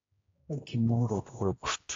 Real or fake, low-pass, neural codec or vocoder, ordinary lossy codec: fake; 7.2 kHz; codec, 16 kHz, 1 kbps, X-Codec, HuBERT features, trained on general audio; AAC, 32 kbps